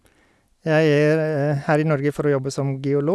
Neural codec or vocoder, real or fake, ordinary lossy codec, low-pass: none; real; none; none